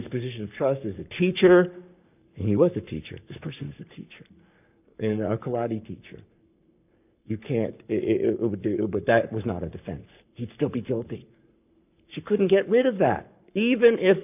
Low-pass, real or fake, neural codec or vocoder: 3.6 kHz; fake; codec, 44.1 kHz, 7.8 kbps, Pupu-Codec